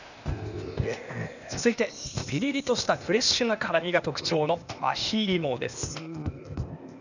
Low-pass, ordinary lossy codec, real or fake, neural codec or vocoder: 7.2 kHz; none; fake; codec, 16 kHz, 0.8 kbps, ZipCodec